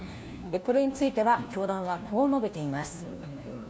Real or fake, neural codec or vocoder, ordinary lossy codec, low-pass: fake; codec, 16 kHz, 1 kbps, FunCodec, trained on LibriTTS, 50 frames a second; none; none